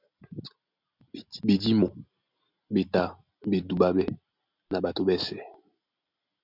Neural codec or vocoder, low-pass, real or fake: none; 5.4 kHz; real